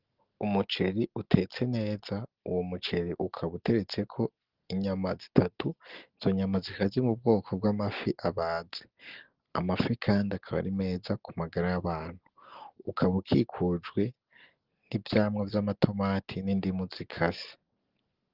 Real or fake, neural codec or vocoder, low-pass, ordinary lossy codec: real; none; 5.4 kHz; Opus, 16 kbps